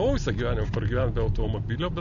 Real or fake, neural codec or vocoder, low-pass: real; none; 7.2 kHz